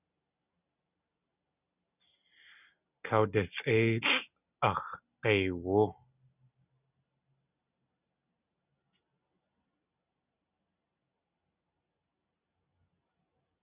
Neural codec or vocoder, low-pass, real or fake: none; 3.6 kHz; real